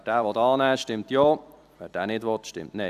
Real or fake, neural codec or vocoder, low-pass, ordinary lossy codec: fake; vocoder, 44.1 kHz, 128 mel bands every 256 samples, BigVGAN v2; 14.4 kHz; AAC, 96 kbps